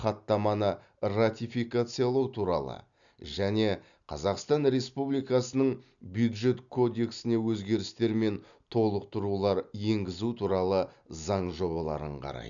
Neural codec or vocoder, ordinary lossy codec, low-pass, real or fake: none; none; 7.2 kHz; real